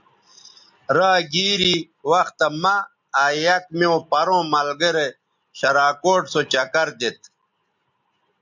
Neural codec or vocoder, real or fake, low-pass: none; real; 7.2 kHz